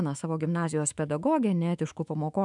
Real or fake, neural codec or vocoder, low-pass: fake; autoencoder, 48 kHz, 128 numbers a frame, DAC-VAE, trained on Japanese speech; 10.8 kHz